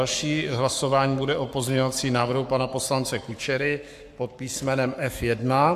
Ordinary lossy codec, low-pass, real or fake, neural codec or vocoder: AAC, 96 kbps; 14.4 kHz; fake; codec, 44.1 kHz, 7.8 kbps, Pupu-Codec